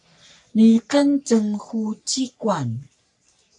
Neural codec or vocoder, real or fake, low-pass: codec, 44.1 kHz, 3.4 kbps, Pupu-Codec; fake; 10.8 kHz